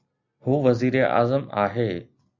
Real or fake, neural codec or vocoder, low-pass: real; none; 7.2 kHz